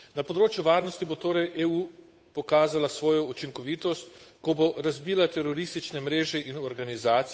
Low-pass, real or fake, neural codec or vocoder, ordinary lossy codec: none; fake; codec, 16 kHz, 8 kbps, FunCodec, trained on Chinese and English, 25 frames a second; none